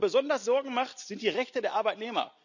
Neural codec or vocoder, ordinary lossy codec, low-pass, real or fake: none; none; 7.2 kHz; real